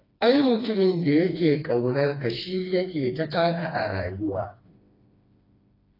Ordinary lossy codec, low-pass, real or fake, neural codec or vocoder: AAC, 24 kbps; 5.4 kHz; fake; codec, 16 kHz, 2 kbps, FreqCodec, smaller model